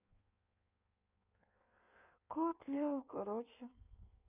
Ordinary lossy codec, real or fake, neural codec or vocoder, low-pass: none; fake; codec, 16 kHz in and 24 kHz out, 1.1 kbps, FireRedTTS-2 codec; 3.6 kHz